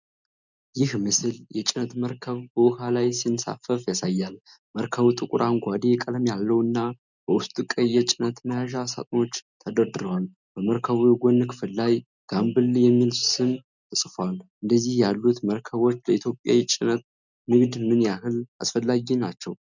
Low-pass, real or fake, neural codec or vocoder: 7.2 kHz; real; none